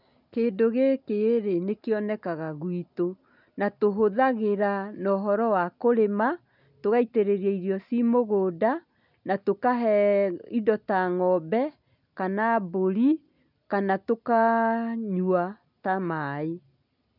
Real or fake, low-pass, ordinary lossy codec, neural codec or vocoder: real; 5.4 kHz; none; none